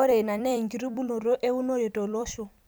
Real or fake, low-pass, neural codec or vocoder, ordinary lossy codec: fake; none; vocoder, 44.1 kHz, 128 mel bands every 256 samples, BigVGAN v2; none